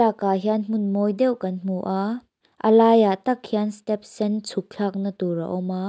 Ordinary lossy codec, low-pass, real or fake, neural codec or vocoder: none; none; real; none